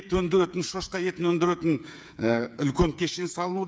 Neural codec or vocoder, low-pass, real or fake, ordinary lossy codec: codec, 16 kHz, 8 kbps, FreqCodec, smaller model; none; fake; none